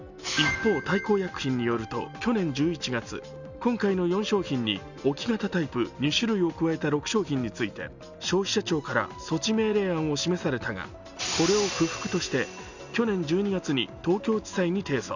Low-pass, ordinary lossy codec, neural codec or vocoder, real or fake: 7.2 kHz; none; none; real